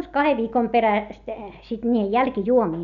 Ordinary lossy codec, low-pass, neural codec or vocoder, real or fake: none; 7.2 kHz; none; real